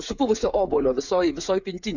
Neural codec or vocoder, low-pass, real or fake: codec, 16 kHz, 8 kbps, FreqCodec, smaller model; 7.2 kHz; fake